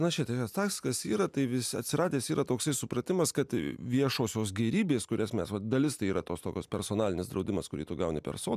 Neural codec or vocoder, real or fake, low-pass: none; real; 14.4 kHz